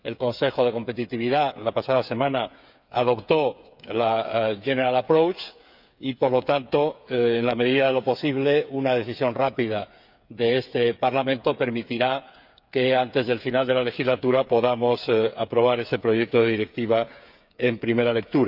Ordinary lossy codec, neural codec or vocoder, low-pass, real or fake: none; codec, 16 kHz, 8 kbps, FreqCodec, smaller model; 5.4 kHz; fake